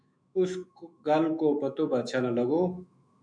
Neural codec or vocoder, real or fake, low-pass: autoencoder, 48 kHz, 128 numbers a frame, DAC-VAE, trained on Japanese speech; fake; 9.9 kHz